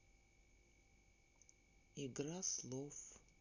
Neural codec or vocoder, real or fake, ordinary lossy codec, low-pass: none; real; none; 7.2 kHz